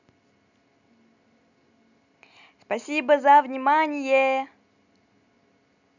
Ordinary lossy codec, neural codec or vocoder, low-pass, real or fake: none; none; 7.2 kHz; real